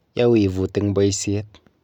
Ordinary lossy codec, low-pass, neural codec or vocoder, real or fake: none; 19.8 kHz; none; real